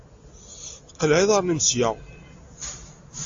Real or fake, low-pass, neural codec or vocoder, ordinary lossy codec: real; 7.2 kHz; none; AAC, 48 kbps